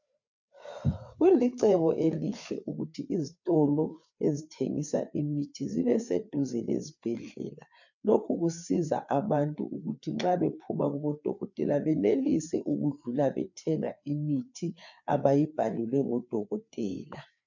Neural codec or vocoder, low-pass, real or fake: codec, 16 kHz, 4 kbps, FreqCodec, larger model; 7.2 kHz; fake